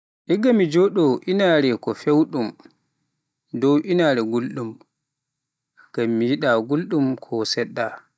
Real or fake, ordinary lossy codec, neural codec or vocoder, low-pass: real; none; none; none